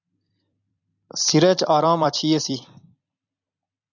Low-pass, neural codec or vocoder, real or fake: 7.2 kHz; vocoder, 44.1 kHz, 128 mel bands every 256 samples, BigVGAN v2; fake